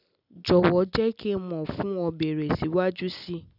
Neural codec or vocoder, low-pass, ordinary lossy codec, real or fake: none; 5.4 kHz; none; real